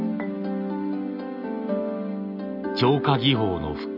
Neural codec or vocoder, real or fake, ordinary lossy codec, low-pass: none; real; none; 5.4 kHz